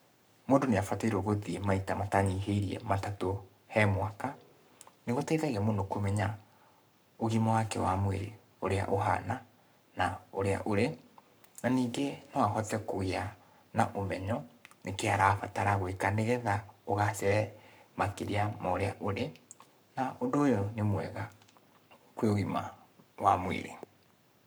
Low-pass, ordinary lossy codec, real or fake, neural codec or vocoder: none; none; fake; codec, 44.1 kHz, 7.8 kbps, Pupu-Codec